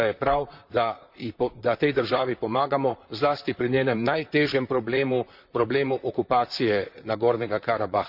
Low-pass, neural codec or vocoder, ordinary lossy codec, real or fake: 5.4 kHz; vocoder, 44.1 kHz, 128 mel bands, Pupu-Vocoder; none; fake